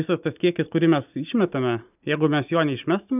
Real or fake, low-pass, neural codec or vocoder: real; 3.6 kHz; none